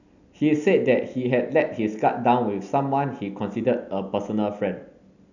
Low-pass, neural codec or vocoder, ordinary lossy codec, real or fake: 7.2 kHz; none; none; real